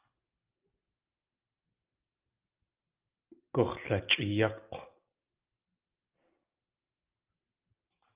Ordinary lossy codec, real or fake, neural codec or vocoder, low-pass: Opus, 24 kbps; real; none; 3.6 kHz